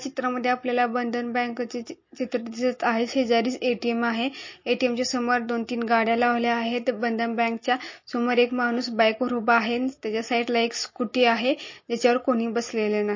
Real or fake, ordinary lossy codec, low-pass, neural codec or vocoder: real; MP3, 32 kbps; 7.2 kHz; none